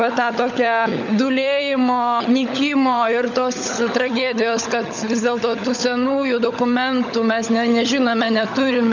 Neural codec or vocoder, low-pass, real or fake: codec, 16 kHz, 16 kbps, FunCodec, trained on LibriTTS, 50 frames a second; 7.2 kHz; fake